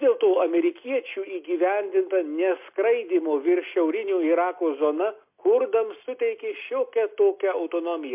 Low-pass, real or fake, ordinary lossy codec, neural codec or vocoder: 3.6 kHz; real; MP3, 32 kbps; none